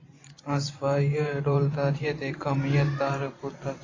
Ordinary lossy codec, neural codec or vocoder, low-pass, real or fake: AAC, 32 kbps; vocoder, 44.1 kHz, 128 mel bands every 512 samples, BigVGAN v2; 7.2 kHz; fake